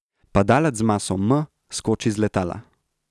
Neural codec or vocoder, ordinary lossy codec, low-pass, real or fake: none; none; none; real